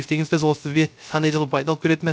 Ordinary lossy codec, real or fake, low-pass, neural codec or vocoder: none; fake; none; codec, 16 kHz, 0.3 kbps, FocalCodec